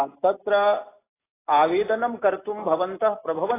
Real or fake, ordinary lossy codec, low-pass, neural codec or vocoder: real; AAC, 16 kbps; 3.6 kHz; none